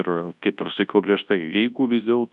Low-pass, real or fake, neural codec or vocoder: 10.8 kHz; fake; codec, 24 kHz, 0.9 kbps, WavTokenizer, large speech release